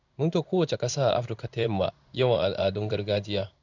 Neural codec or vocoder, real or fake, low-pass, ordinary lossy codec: codec, 16 kHz in and 24 kHz out, 1 kbps, XY-Tokenizer; fake; 7.2 kHz; none